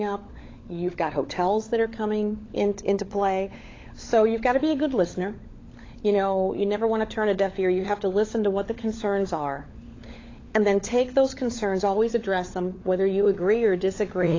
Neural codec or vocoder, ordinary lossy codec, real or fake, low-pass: codec, 16 kHz, 16 kbps, FunCodec, trained on LibriTTS, 50 frames a second; AAC, 32 kbps; fake; 7.2 kHz